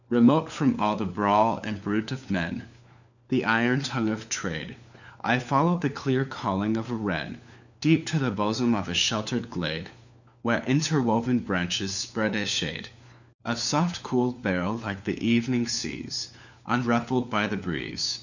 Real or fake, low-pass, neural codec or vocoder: fake; 7.2 kHz; codec, 16 kHz, 4 kbps, FunCodec, trained on LibriTTS, 50 frames a second